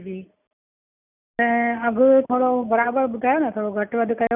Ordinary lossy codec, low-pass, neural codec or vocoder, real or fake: none; 3.6 kHz; none; real